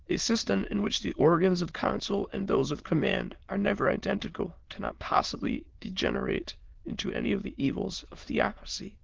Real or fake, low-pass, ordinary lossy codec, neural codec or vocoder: fake; 7.2 kHz; Opus, 16 kbps; autoencoder, 22.05 kHz, a latent of 192 numbers a frame, VITS, trained on many speakers